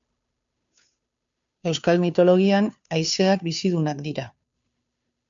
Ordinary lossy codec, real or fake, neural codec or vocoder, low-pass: MP3, 64 kbps; fake; codec, 16 kHz, 2 kbps, FunCodec, trained on Chinese and English, 25 frames a second; 7.2 kHz